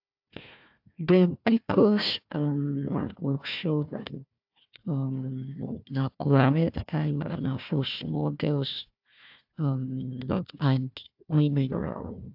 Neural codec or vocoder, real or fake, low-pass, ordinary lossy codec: codec, 16 kHz, 1 kbps, FunCodec, trained on Chinese and English, 50 frames a second; fake; 5.4 kHz; AAC, 48 kbps